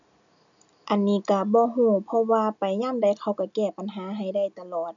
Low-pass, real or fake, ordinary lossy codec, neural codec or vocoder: 7.2 kHz; real; none; none